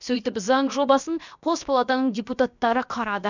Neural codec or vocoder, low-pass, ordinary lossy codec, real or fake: codec, 16 kHz, about 1 kbps, DyCAST, with the encoder's durations; 7.2 kHz; none; fake